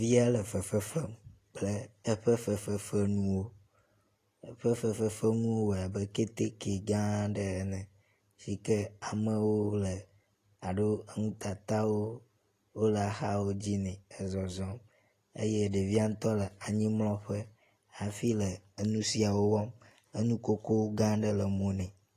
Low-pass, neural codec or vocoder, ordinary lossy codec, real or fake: 14.4 kHz; none; AAC, 48 kbps; real